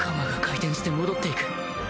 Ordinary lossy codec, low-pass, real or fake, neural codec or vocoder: none; none; real; none